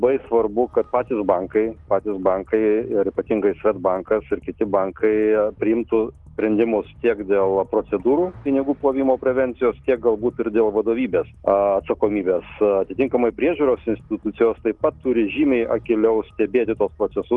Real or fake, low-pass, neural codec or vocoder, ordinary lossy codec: real; 10.8 kHz; none; Opus, 24 kbps